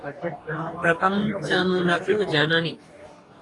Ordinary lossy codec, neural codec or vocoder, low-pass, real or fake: AAC, 32 kbps; codec, 44.1 kHz, 2.6 kbps, DAC; 10.8 kHz; fake